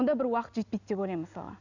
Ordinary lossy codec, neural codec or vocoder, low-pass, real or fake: none; none; 7.2 kHz; real